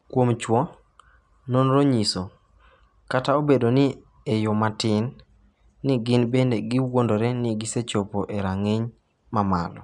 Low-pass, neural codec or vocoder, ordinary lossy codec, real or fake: 10.8 kHz; none; none; real